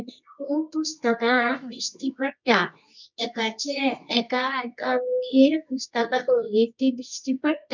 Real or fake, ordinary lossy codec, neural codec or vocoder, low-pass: fake; none; codec, 24 kHz, 0.9 kbps, WavTokenizer, medium music audio release; 7.2 kHz